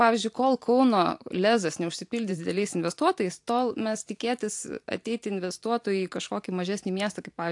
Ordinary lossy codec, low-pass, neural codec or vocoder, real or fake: AAC, 64 kbps; 10.8 kHz; vocoder, 44.1 kHz, 128 mel bands every 512 samples, BigVGAN v2; fake